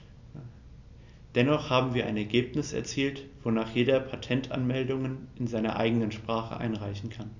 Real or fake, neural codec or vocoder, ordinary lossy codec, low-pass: real; none; none; 7.2 kHz